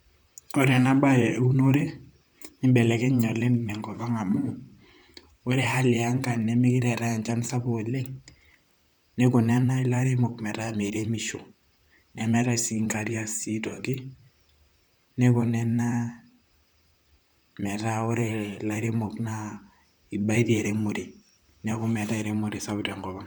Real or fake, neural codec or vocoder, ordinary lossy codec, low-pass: fake; vocoder, 44.1 kHz, 128 mel bands, Pupu-Vocoder; none; none